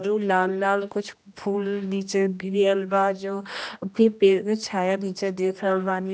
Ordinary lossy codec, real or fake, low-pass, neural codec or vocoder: none; fake; none; codec, 16 kHz, 1 kbps, X-Codec, HuBERT features, trained on general audio